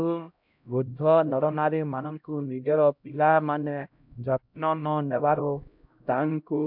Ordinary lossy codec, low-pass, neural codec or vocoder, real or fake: MP3, 48 kbps; 5.4 kHz; codec, 16 kHz, 0.5 kbps, X-Codec, HuBERT features, trained on LibriSpeech; fake